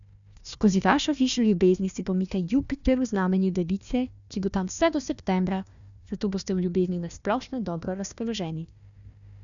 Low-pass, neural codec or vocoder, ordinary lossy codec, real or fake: 7.2 kHz; codec, 16 kHz, 1 kbps, FunCodec, trained on Chinese and English, 50 frames a second; none; fake